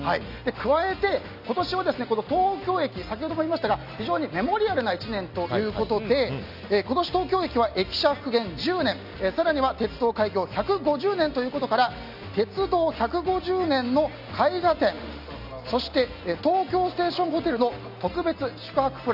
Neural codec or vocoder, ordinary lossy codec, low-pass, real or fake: none; none; 5.4 kHz; real